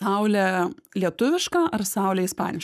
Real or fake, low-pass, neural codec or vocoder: fake; 14.4 kHz; codec, 44.1 kHz, 7.8 kbps, Pupu-Codec